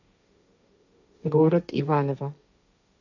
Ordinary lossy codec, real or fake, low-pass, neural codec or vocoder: none; fake; 7.2 kHz; codec, 16 kHz, 1.1 kbps, Voila-Tokenizer